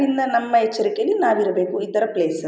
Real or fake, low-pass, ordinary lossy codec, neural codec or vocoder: real; none; none; none